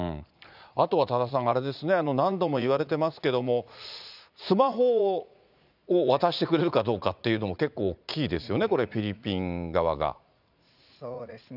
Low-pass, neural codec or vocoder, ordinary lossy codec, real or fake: 5.4 kHz; vocoder, 44.1 kHz, 80 mel bands, Vocos; none; fake